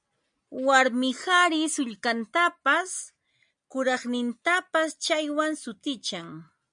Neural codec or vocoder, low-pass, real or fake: none; 9.9 kHz; real